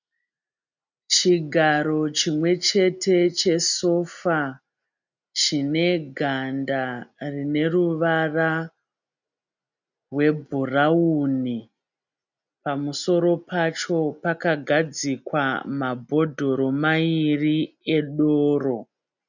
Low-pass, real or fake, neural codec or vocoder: 7.2 kHz; real; none